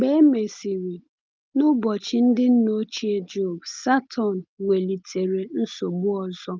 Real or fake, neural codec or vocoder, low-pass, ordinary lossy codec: real; none; 7.2 kHz; Opus, 24 kbps